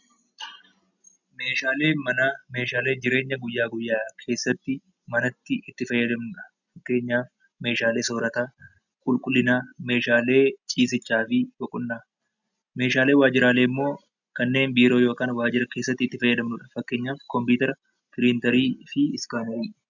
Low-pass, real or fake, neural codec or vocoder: 7.2 kHz; real; none